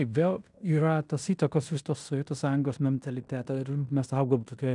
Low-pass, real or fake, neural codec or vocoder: 10.8 kHz; fake; codec, 16 kHz in and 24 kHz out, 0.9 kbps, LongCat-Audio-Codec, fine tuned four codebook decoder